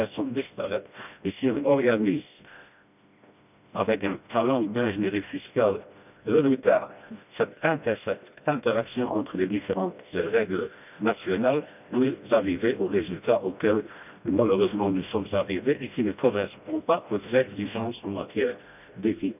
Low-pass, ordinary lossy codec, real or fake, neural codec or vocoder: 3.6 kHz; none; fake; codec, 16 kHz, 1 kbps, FreqCodec, smaller model